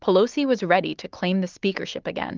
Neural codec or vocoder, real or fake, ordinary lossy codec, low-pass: none; real; Opus, 32 kbps; 7.2 kHz